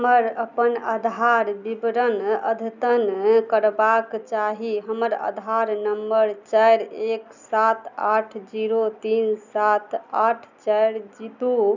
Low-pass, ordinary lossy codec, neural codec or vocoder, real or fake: 7.2 kHz; none; none; real